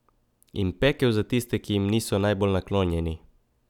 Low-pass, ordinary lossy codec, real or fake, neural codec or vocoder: 19.8 kHz; none; real; none